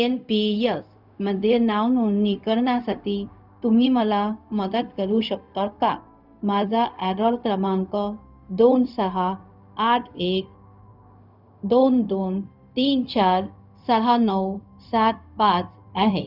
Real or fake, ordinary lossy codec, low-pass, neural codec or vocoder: fake; none; 5.4 kHz; codec, 16 kHz, 0.4 kbps, LongCat-Audio-Codec